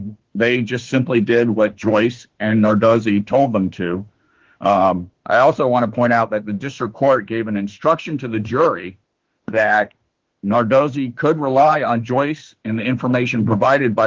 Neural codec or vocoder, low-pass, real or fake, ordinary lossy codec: autoencoder, 48 kHz, 32 numbers a frame, DAC-VAE, trained on Japanese speech; 7.2 kHz; fake; Opus, 16 kbps